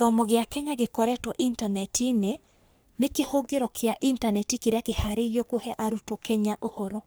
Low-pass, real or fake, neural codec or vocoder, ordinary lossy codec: none; fake; codec, 44.1 kHz, 3.4 kbps, Pupu-Codec; none